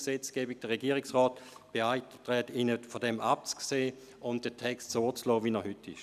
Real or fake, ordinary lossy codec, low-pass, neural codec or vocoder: real; none; 14.4 kHz; none